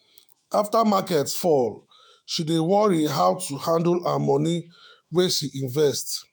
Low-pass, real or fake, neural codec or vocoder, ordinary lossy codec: none; fake; autoencoder, 48 kHz, 128 numbers a frame, DAC-VAE, trained on Japanese speech; none